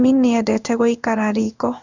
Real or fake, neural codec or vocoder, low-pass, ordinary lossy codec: fake; codec, 16 kHz in and 24 kHz out, 1 kbps, XY-Tokenizer; 7.2 kHz; none